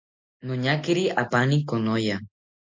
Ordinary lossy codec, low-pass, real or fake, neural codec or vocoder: MP3, 48 kbps; 7.2 kHz; real; none